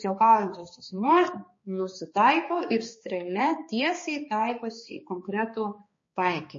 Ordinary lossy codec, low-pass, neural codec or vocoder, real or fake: MP3, 32 kbps; 7.2 kHz; codec, 16 kHz, 2 kbps, X-Codec, HuBERT features, trained on balanced general audio; fake